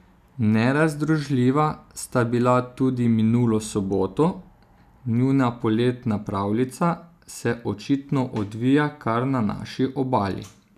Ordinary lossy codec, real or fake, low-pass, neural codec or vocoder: none; real; 14.4 kHz; none